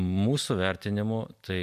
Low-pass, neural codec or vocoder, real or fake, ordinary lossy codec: 14.4 kHz; none; real; MP3, 96 kbps